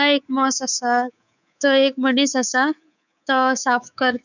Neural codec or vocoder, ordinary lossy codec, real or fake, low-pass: codec, 24 kHz, 3.1 kbps, DualCodec; none; fake; 7.2 kHz